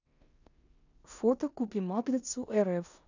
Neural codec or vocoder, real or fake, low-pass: codec, 16 kHz in and 24 kHz out, 0.9 kbps, LongCat-Audio-Codec, four codebook decoder; fake; 7.2 kHz